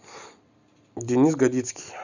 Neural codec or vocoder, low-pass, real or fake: none; 7.2 kHz; real